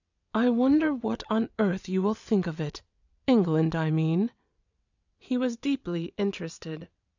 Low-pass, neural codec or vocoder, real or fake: 7.2 kHz; vocoder, 22.05 kHz, 80 mel bands, WaveNeXt; fake